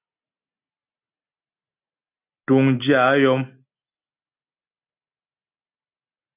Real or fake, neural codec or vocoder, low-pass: real; none; 3.6 kHz